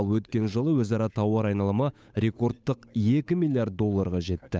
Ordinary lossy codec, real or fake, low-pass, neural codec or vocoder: none; fake; none; codec, 16 kHz, 8 kbps, FunCodec, trained on Chinese and English, 25 frames a second